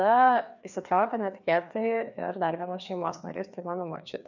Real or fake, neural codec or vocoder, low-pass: fake; codec, 16 kHz, 2 kbps, FreqCodec, larger model; 7.2 kHz